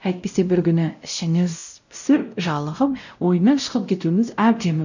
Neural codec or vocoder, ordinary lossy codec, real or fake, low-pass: codec, 16 kHz, 0.5 kbps, X-Codec, WavLM features, trained on Multilingual LibriSpeech; none; fake; 7.2 kHz